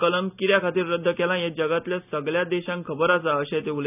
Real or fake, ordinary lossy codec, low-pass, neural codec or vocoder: real; none; 3.6 kHz; none